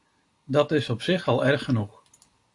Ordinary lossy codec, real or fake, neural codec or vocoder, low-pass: AAC, 48 kbps; real; none; 10.8 kHz